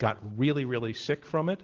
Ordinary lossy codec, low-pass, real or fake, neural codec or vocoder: Opus, 16 kbps; 7.2 kHz; real; none